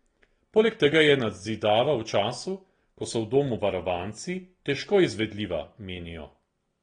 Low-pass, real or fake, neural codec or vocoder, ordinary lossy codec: 9.9 kHz; real; none; AAC, 32 kbps